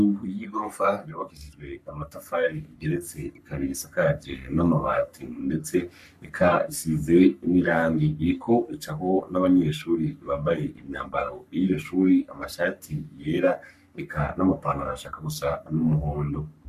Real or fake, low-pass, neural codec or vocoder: fake; 14.4 kHz; codec, 44.1 kHz, 3.4 kbps, Pupu-Codec